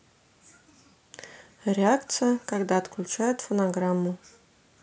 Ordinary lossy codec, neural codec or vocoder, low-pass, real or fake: none; none; none; real